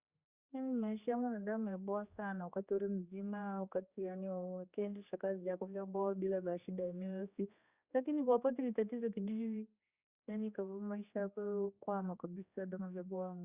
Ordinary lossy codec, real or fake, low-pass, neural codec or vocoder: Opus, 64 kbps; fake; 3.6 kHz; codec, 16 kHz, 2 kbps, X-Codec, HuBERT features, trained on general audio